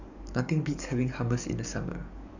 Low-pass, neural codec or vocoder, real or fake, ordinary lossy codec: 7.2 kHz; codec, 44.1 kHz, 7.8 kbps, DAC; fake; none